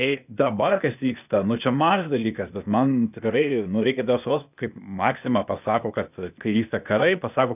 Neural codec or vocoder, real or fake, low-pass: codec, 16 kHz, 0.8 kbps, ZipCodec; fake; 3.6 kHz